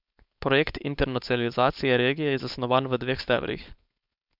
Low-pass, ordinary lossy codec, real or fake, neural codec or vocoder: 5.4 kHz; none; fake; codec, 16 kHz, 4.8 kbps, FACodec